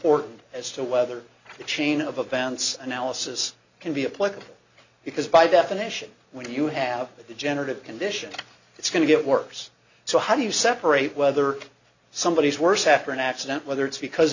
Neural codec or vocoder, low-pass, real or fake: none; 7.2 kHz; real